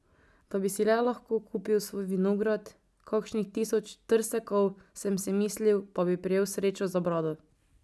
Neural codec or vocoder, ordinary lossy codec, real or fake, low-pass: none; none; real; none